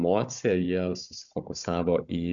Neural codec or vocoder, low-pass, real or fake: codec, 16 kHz, 16 kbps, FunCodec, trained on Chinese and English, 50 frames a second; 7.2 kHz; fake